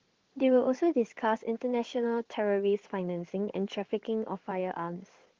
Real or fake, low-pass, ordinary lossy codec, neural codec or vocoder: fake; 7.2 kHz; Opus, 16 kbps; codec, 16 kHz in and 24 kHz out, 2.2 kbps, FireRedTTS-2 codec